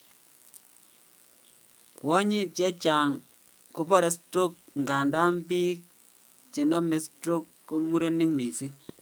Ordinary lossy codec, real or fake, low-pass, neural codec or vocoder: none; fake; none; codec, 44.1 kHz, 2.6 kbps, SNAC